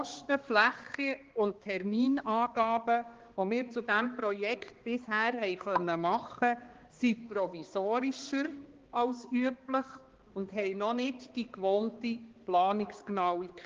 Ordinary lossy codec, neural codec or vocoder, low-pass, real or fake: Opus, 16 kbps; codec, 16 kHz, 2 kbps, X-Codec, HuBERT features, trained on balanced general audio; 7.2 kHz; fake